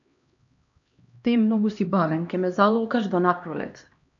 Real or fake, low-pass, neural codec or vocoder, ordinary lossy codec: fake; 7.2 kHz; codec, 16 kHz, 1 kbps, X-Codec, HuBERT features, trained on LibriSpeech; AAC, 64 kbps